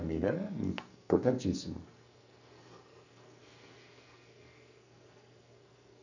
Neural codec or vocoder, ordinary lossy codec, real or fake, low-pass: codec, 44.1 kHz, 2.6 kbps, SNAC; none; fake; 7.2 kHz